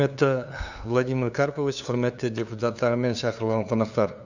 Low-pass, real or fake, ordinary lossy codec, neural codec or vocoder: 7.2 kHz; fake; none; codec, 16 kHz, 2 kbps, FunCodec, trained on LibriTTS, 25 frames a second